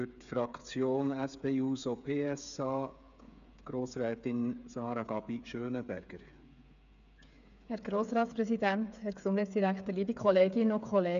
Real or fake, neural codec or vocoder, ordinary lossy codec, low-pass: fake; codec, 16 kHz, 8 kbps, FreqCodec, smaller model; none; 7.2 kHz